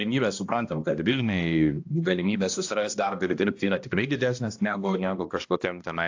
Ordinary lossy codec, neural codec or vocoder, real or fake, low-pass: AAC, 48 kbps; codec, 16 kHz, 1 kbps, X-Codec, HuBERT features, trained on balanced general audio; fake; 7.2 kHz